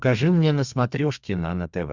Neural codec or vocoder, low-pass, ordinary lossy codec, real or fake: codec, 32 kHz, 1.9 kbps, SNAC; 7.2 kHz; Opus, 64 kbps; fake